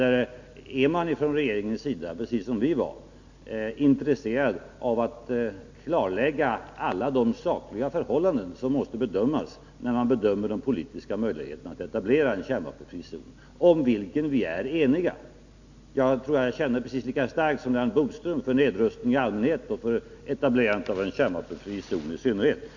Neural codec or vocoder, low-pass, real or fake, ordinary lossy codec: none; 7.2 kHz; real; none